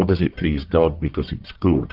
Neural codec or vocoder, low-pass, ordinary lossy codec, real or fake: codec, 44.1 kHz, 1.7 kbps, Pupu-Codec; 5.4 kHz; Opus, 32 kbps; fake